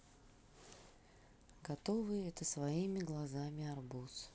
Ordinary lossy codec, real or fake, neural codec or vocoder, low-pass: none; real; none; none